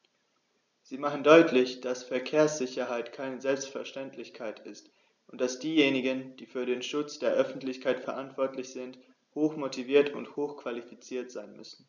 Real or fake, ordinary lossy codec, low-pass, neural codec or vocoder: real; none; 7.2 kHz; none